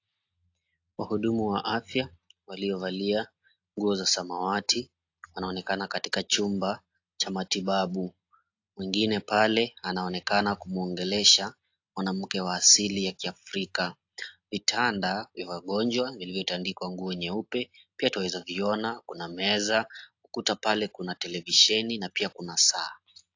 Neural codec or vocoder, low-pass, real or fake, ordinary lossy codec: none; 7.2 kHz; real; AAC, 48 kbps